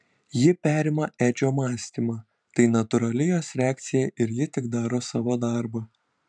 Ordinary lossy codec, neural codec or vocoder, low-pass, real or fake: MP3, 96 kbps; vocoder, 44.1 kHz, 128 mel bands every 512 samples, BigVGAN v2; 9.9 kHz; fake